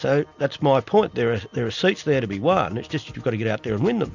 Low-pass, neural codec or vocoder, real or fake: 7.2 kHz; none; real